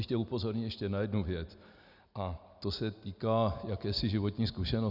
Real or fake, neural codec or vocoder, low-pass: real; none; 5.4 kHz